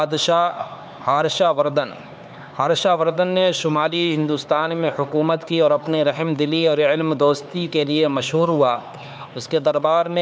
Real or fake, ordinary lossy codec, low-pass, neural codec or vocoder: fake; none; none; codec, 16 kHz, 4 kbps, X-Codec, HuBERT features, trained on LibriSpeech